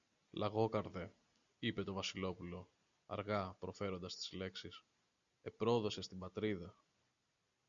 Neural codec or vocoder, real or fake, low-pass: none; real; 7.2 kHz